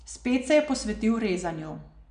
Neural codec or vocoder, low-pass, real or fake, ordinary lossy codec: none; 9.9 kHz; real; none